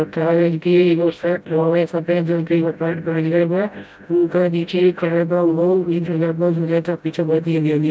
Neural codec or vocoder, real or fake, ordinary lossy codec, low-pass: codec, 16 kHz, 0.5 kbps, FreqCodec, smaller model; fake; none; none